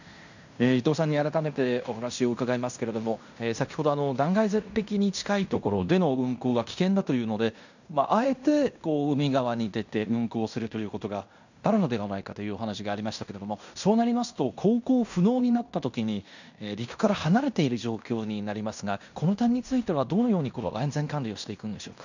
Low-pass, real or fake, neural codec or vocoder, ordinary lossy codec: 7.2 kHz; fake; codec, 16 kHz in and 24 kHz out, 0.9 kbps, LongCat-Audio-Codec, fine tuned four codebook decoder; none